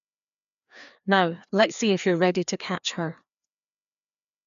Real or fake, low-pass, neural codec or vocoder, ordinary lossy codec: fake; 7.2 kHz; codec, 16 kHz, 2 kbps, FreqCodec, larger model; none